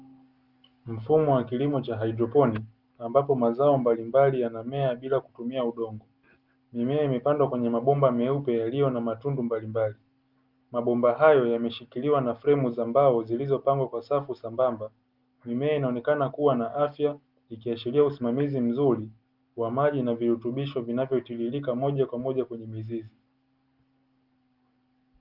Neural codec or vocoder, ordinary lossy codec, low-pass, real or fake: none; Opus, 32 kbps; 5.4 kHz; real